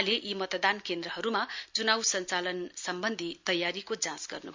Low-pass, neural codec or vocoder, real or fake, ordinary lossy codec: 7.2 kHz; none; real; MP3, 64 kbps